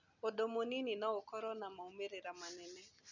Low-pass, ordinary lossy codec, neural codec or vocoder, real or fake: 7.2 kHz; none; none; real